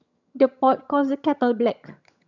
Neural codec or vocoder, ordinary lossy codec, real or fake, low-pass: vocoder, 22.05 kHz, 80 mel bands, HiFi-GAN; none; fake; 7.2 kHz